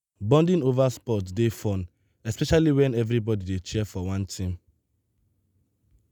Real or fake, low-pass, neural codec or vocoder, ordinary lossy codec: real; none; none; none